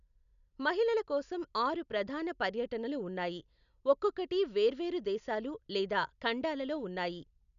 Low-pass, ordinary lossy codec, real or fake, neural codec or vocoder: 7.2 kHz; none; real; none